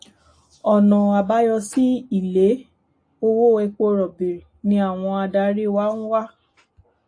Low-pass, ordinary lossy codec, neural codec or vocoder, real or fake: 9.9 kHz; AAC, 48 kbps; none; real